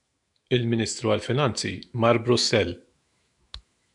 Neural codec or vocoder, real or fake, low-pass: autoencoder, 48 kHz, 128 numbers a frame, DAC-VAE, trained on Japanese speech; fake; 10.8 kHz